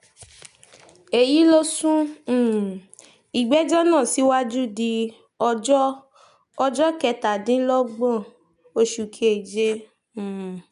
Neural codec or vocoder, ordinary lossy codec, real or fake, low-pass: none; none; real; 10.8 kHz